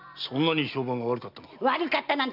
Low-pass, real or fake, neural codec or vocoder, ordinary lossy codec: 5.4 kHz; real; none; none